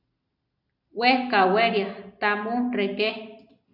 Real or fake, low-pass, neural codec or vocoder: real; 5.4 kHz; none